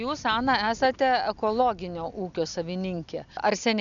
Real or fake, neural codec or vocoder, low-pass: real; none; 7.2 kHz